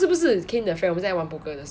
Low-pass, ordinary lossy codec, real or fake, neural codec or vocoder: none; none; real; none